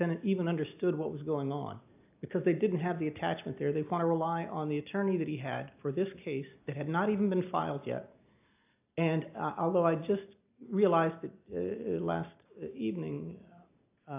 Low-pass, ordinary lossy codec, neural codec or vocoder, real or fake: 3.6 kHz; AAC, 32 kbps; none; real